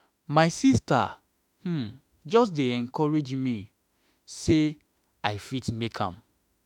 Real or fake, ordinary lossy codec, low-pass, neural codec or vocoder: fake; none; 19.8 kHz; autoencoder, 48 kHz, 32 numbers a frame, DAC-VAE, trained on Japanese speech